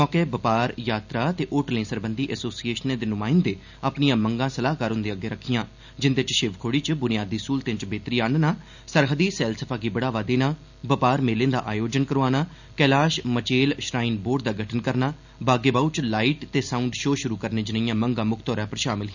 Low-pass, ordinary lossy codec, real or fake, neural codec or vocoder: 7.2 kHz; none; real; none